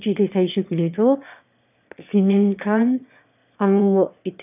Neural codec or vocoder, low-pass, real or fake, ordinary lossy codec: autoencoder, 22.05 kHz, a latent of 192 numbers a frame, VITS, trained on one speaker; 3.6 kHz; fake; none